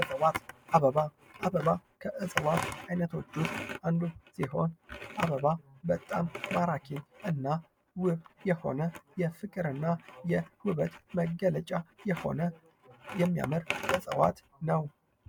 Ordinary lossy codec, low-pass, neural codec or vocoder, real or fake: MP3, 96 kbps; 19.8 kHz; none; real